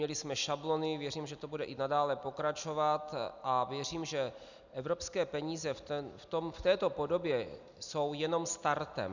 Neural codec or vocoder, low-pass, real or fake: none; 7.2 kHz; real